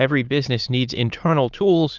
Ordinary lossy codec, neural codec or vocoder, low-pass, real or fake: Opus, 24 kbps; autoencoder, 22.05 kHz, a latent of 192 numbers a frame, VITS, trained on many speakers; 7.2 kHz; fake